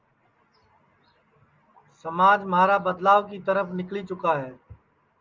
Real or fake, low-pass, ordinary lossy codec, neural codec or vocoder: real; 7.2 kHz; Opus, 32 kbps; none